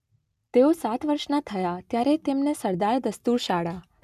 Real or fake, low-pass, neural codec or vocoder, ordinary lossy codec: real; 14.4 kHz; none; none